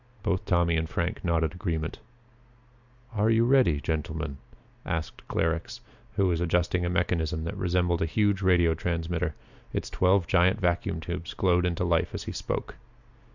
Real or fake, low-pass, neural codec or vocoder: real; 7.2 kHz; none